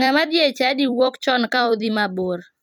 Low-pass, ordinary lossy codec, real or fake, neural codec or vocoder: 19.8 kHz; none; fake; vocoder, 44.1 kHz, 128 mel bands every 256 samples, BigVGAN v2